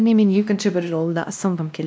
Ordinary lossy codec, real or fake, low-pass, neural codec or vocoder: none; fake; none; codec, 16 kHz, 1 kbps, X-Codec, WavLM features, trained on Multilingual LibriSpeech